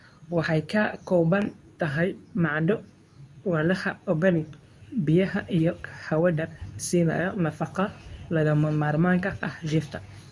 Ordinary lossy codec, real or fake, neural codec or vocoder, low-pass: none; fake; codec, 24 kHz, 0.9 kbps, WavTokenizer, medium speech release version 2; none